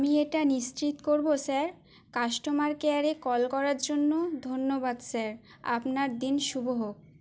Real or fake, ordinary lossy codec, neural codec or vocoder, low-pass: real; none; none; none